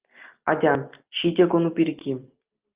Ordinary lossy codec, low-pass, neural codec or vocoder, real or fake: Opus, 24 kbps; 3.6 kHz; none; real